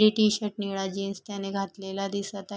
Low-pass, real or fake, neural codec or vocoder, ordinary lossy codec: none; real; none; none